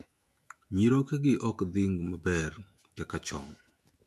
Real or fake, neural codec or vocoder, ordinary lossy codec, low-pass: fake; autoencoder, 48 kHz, 128 numbers a frame, DAC-VAE, trained on Japanese speech; MP3, 64 kbps; 14.4 kHz